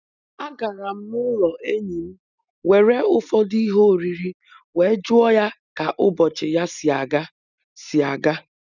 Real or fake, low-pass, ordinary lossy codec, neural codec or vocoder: real; 7.2 kHz; none; none